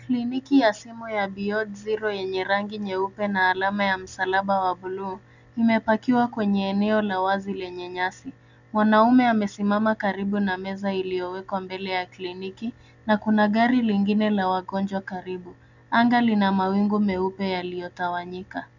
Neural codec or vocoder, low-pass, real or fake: none; 7.2 kHz; real